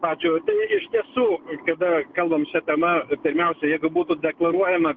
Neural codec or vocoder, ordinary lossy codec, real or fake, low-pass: none; Opus, 32 kbps; real; 7.2 kHz